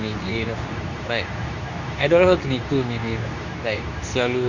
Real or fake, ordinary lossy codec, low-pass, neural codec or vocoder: fake; none; 7.2 kHz; codec, 24 kHz, 0.9 kbps, WavTokenizer, medium speech release version 2